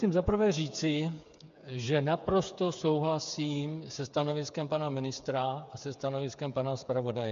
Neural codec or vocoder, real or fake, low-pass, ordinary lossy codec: codec, 16 kHz, 8 kbps, FreqCodec, smaller model; fake; 7.2 kHz; MP3, 64 kbps